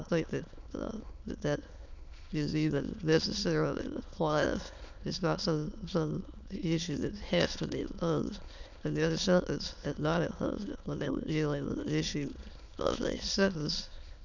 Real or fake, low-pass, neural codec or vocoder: fake; 7.2 kHz; autoencoder, 22.05 kHz, a latent of 192 numbers a frame, VITS, trained on many speakers